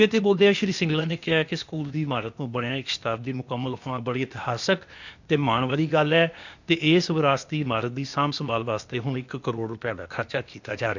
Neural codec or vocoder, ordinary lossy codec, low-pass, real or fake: codec, 16 kHz, 0.8 kbps, ZipCodec; none; 7.2 kHz; fake